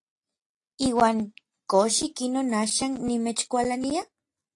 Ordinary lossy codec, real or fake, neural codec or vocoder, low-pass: AAC, 48 kbps; real; none; 10.8 kHz